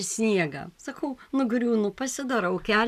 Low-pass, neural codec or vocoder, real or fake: 14.4 kHz; none; real